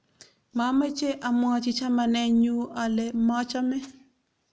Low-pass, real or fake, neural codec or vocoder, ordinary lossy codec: none; real; none; none